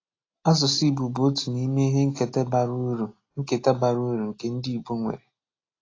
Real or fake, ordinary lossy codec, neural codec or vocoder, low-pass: real; AAC, 32 kbps; none; 7.2 kHz